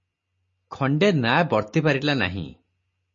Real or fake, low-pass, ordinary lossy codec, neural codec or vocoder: real; 7.2 kHz; MP3, 32 kbps; none